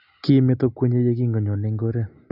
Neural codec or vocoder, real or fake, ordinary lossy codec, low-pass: none; real; none; 5.4 kHz